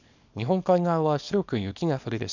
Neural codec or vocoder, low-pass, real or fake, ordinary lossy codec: codec, 24 kHz, 0.9 kbps, WavTokenizer, small release; 7.2 kHz; fake; none